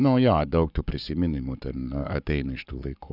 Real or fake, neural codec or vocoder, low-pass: fake; codec, 44.1 kHz, 7.8 kbps, Pupu-Codec; 5.4 kHz